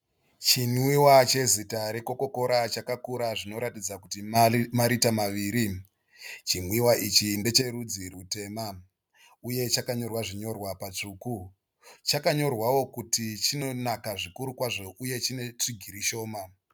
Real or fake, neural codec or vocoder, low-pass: real; none; 19.8 kHz